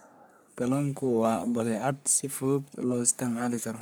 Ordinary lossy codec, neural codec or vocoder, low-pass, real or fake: none; codec, 44.1 kHz, 3.4 kbps, Pupu-Codec; none; fake